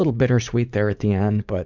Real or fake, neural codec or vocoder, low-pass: fake; vocoder, 44.1 kHz, 80 mel bands, Vocos; 7.2 kHz